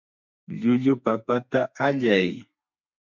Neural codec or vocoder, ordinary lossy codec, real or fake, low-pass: codec, 32 kHz, 1.9 kbps, SNAC; MP3, 64 kbps; fake; 7.2 kHz